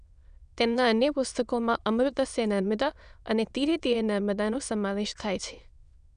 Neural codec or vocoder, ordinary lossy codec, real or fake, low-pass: autoencoder, 22.05 kHz, a latent of 192 numbers a frame, VITS, trained on many speakers; none; fake; 9.9 kHz